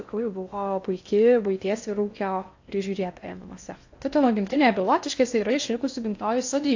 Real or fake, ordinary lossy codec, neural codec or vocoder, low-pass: fake; AAC, 48 kbps; codec, 16 kHz in and 24 kHz out, 0.6 kbps, FocalCodec, streaming, 4096 codes; 7.2 kHz